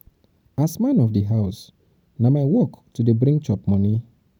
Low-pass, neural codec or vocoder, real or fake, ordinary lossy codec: 19.8 kHz; vocoder, 44.1 kHz, 128 mel bands every 512 samples, BigVGAN v2; fake; none